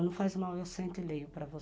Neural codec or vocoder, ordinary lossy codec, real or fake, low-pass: none; none; real; none